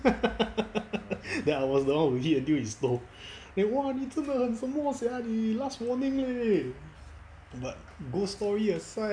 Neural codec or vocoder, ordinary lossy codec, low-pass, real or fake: none; none; 9.9 kHz; real